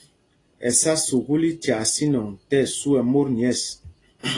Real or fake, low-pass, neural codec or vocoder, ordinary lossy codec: real; 10.8 kHz; none; AAC, 32 kbps